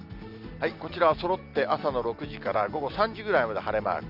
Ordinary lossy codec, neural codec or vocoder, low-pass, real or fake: Opus, 64 kbps; none; 5.4 kHz; real